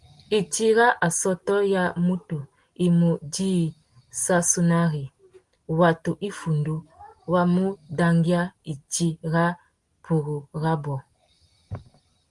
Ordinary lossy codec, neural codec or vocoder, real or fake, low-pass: Opus, 24 kbps; none; real; 10.8 kHz